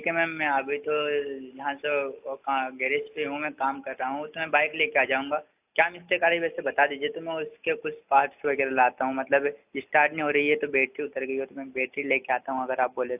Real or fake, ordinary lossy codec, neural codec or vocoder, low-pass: real; AAC, 32 kbps; none; 3.6 kHz